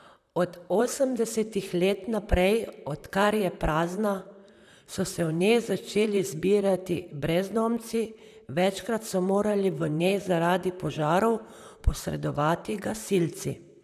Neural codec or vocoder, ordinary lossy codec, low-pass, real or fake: vocoder, 44.1 kHz, 128 mel bands, Pupu-Vocoder; none; 14.4 kHz; fake